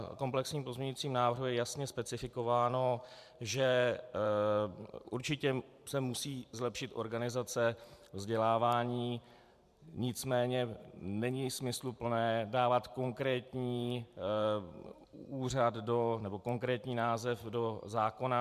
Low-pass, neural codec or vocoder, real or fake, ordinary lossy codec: 14.4 kHz; vocoder, 44.1 kHz, 128 mel bands every 512 samples, BigVGAN v2; fake; MP3, 96 kbps